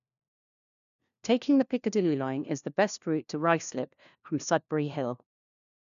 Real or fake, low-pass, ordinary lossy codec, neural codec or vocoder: fake; 7.2 kHz; none; codec, 16 kHz, 1 kbps, FunCodec, trained on LibriTTS, 50 frames a second